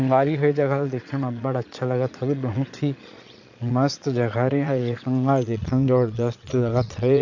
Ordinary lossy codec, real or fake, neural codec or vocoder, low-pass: AAC, 48 kbps; fake; vocoder, 22.05 kHz, 80 mel bands, Vocos; 7.2 kHz